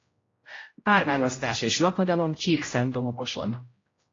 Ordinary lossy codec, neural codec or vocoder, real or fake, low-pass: AAC, 32 kbps; codec, 16 kHz, 0.5 kbps, X-Codec, HuBERT features, trained on general audio; fake; 7.2 kHz